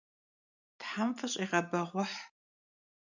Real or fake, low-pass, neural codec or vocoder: real; 7.2 kHz; none